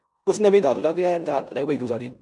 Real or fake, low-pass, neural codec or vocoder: fake; 10.8 kHz; codec, 16 kHz in and 24 kHz out, 0.9 kbps, LongCat-Audio-Codec, four codebook decoder